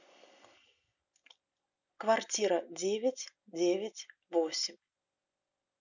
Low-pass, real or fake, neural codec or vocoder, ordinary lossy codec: 7.2 kHz; real; none; none